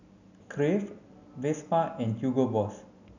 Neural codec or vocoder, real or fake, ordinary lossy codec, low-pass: none; real; none; 7.2 kHz